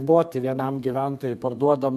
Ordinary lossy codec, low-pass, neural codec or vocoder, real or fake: MP3, 96 kbps; 14.4 kHz; codec, 32 kHz, 1.9 kbps, SNAC; fake